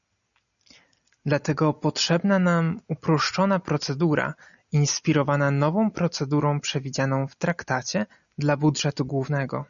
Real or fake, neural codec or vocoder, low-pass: real; none; 7.2 kHz